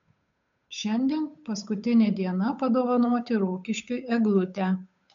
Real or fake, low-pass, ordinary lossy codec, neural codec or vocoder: fake; 7.2 kHz; MP3, 64 kbps; codec, 16 kHz, 8 kbps, FunCodec, trained on Chinese and English, 25 frames a second